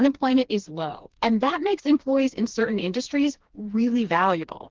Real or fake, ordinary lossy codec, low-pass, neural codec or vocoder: fake; Opus, 16 kbps; 7.2 kHz; codec, 16 kHz, 2 kbps, FreqCodec, smaller model